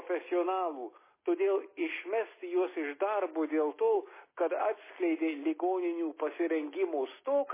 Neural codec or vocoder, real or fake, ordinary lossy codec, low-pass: none; real; MP3, 16 kbps; 3.6 kHz